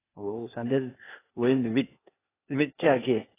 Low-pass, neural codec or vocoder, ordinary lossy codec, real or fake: 3.6 kHz; codec, 16 kHz, 0.8 kbps, ZipCodec; AAC, 16 kbps; fake